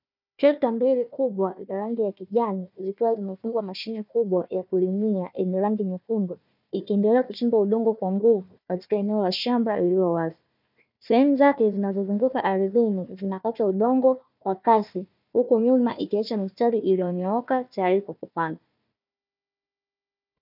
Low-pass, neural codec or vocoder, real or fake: 5.4 kHz; codec, 16 kHz, 1 kbps, FunCodec, trained on Chinese and English, 50 frames a second; fake